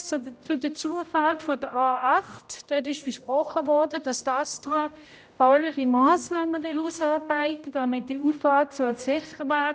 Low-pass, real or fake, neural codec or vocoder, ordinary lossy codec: none; fake; codec, 16 kHz, 0.5 kbps, X-Codec, HuBERT features, trained on general audio; none